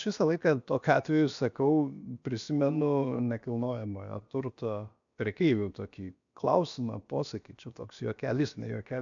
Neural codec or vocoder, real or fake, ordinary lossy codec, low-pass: codec, 16 kHz, about 1 kbps, DyCAST, with the encoder's durations; fake; MP3, 96 kbps; 7.2 kHz